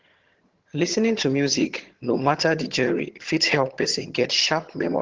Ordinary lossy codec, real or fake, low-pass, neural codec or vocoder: Opus, 16 kbps; fake; 7.2 kHz; vocoder, 22.05 kHz, 80 mel bands, HiFi-GAN